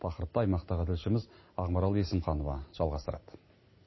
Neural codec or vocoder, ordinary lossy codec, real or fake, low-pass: none; MP3, 24 kbps; real; 7.2 kHz